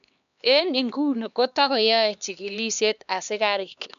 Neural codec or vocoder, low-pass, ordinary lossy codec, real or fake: codec, 16 kHz, 2 kbps, X-Codec, HuBERT features, trained on LibriSpeech; 7.2 kHz; none; fake